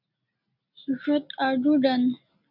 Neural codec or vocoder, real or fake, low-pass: none; real; 5.4 kHz